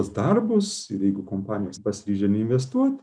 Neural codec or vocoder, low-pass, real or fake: none; 9.9 kHz; real